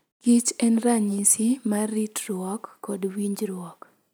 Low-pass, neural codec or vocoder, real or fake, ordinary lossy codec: none; none; real; none